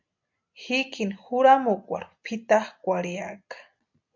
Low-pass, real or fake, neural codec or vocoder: 7.2 kHz; real; none